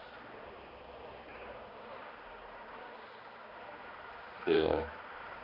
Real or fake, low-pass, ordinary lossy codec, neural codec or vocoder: fake; 5.4 kHz; Opus, 64 kbps; codec, 16 kHz, 2 kbps, X-Codec, HuBERT features, trained on balanced general audio